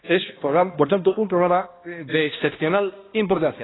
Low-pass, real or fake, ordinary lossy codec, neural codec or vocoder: 7.2 kHz; fake; AAC, 16 kbps; codec, 16 kHz, 1 kbps, X-Codec, HuBERT features, trained on balanced general audio